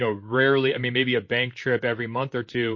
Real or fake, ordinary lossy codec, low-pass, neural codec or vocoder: fake; MP3, 32 kbps; 7.2 kHz; vocoder, 44.1 kHz, 128 mel bands, Pupu-Vocoder